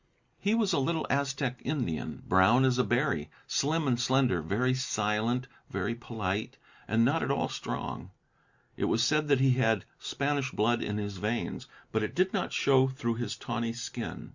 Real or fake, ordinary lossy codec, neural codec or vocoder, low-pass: real; Opus, 64 kbps; none; 7.2 kHz